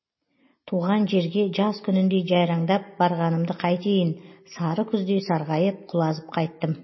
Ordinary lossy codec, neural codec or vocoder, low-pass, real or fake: MP3, 24 kbps; none; 7.2 kHz; real